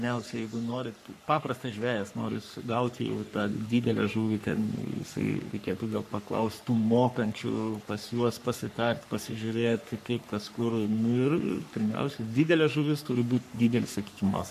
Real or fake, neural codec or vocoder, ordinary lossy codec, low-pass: fake; codec, 44.1 kHz, 3.4 kbps, Pupu-Codec; AAC, 96 kbps; 14.4 kHz